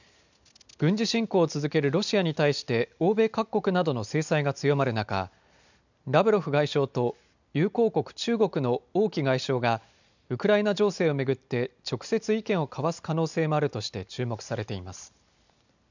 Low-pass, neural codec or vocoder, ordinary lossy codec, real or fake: 7.2 kHz; none; none; real